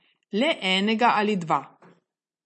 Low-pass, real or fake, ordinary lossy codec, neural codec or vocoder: 9.9 kHz; real; MP3, 32 kbps; none